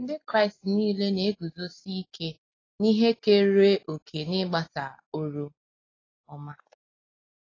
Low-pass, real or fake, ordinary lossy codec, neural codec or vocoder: 7.2 kHz; real; AAC, 32 kbps; none